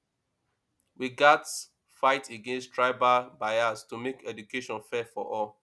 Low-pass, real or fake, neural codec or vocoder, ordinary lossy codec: none; real; none; none